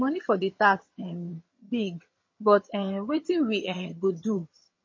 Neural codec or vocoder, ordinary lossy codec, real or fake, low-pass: vocoder, 22.05 kHz, 80 mel bands, HiFi-GAN; MP3, 32 kbps; fake; 7.2 kHz